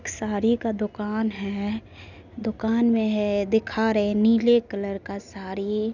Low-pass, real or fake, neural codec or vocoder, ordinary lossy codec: 7.2 kHz; real; none; none